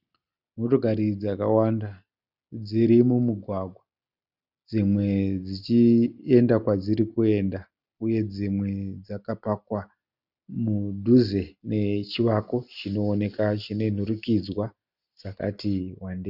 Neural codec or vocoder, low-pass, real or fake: none; 5.4 kHz; real